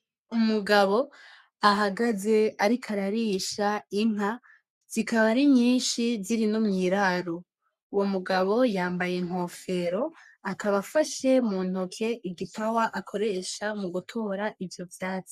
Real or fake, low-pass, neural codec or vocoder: fake; 14.4 kHz; codec, 44.1 kHz, 3.4 kbps, Pupu-Codec